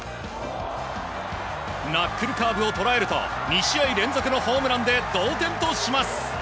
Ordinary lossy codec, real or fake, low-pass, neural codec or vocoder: none; real; none; none